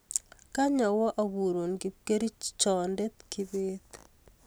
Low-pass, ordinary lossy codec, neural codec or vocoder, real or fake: none; none; none; real